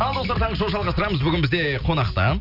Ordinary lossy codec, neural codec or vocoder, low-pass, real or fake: none; none; 5.4 kHz; real